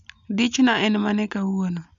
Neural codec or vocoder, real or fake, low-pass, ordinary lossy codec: none; real; 7.2 kHz; MP3, 96 kbps